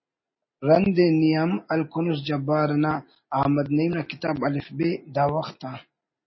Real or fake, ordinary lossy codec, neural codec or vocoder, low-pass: real; MP3, 24 kbps; none; 7.2 kHz